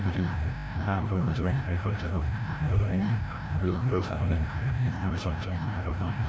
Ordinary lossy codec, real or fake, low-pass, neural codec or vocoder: none; fake; none; codec, 16 kHz, 0.5 kbps, FreqCodec, larger model